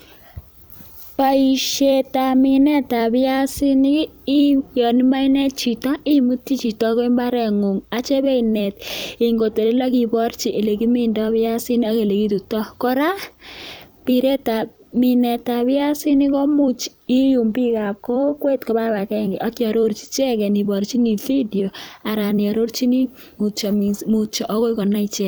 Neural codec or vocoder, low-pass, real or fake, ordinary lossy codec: vocoder, 44.1 kHz, 128 mel bands every 256 samples, BigVGAN v2; none; fake; none